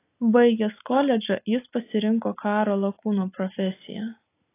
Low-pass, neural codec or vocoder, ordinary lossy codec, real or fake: 3.6 kHz; none; AAC, 24 kbps; real